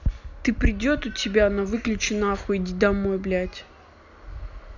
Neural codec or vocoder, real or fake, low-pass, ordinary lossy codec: none; real; 7.2 kHz; none